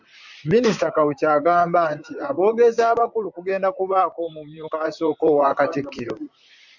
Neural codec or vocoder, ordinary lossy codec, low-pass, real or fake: vocoder, 44.1 kHz, 128 mel bands, Pupu-Vocoder; MP3, 64 kbps; 7.2 kHz; fake